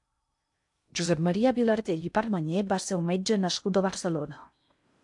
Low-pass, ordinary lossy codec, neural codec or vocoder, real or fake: 10.8 kHz; AAC, 64 kbps; codec, 16 kHz in and 24 kHz out, 0.6 kbps, FocalCodec, streaming, 2048 codes; fake